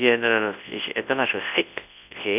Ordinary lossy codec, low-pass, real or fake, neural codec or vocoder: none; 3.6 kHz; fake; codec, 24 kHz, 0.9 kbps, WavTokenizer, large speech release